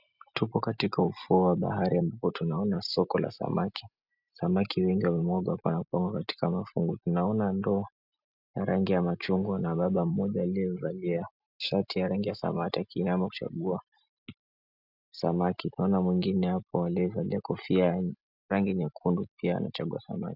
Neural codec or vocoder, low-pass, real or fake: none; 5.4 kHz; real